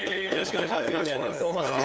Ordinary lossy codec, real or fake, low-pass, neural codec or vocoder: none; fake; none; codec, 16 kHz, 8 kbps, FunCodec, trained on LibriTTS, 25 frames a second